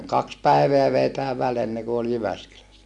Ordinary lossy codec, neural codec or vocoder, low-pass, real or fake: none; none; 10.8 kHz; real